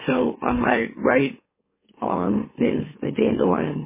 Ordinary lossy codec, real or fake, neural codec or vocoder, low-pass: MP3, 16 kbps; fake; autoencoder, 44.1 kHz, a latent of 192 numbers a frame, MeloTTS; 3.6 kHz